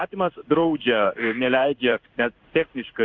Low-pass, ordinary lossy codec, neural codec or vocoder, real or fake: 7.2 kHz; Opus, 32 kbps; codec, 16 kHz in and 24 kHz out, 1 kbps, XY-Tokenizer; fake